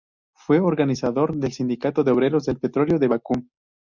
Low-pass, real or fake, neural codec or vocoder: 7.2 kHz; real; none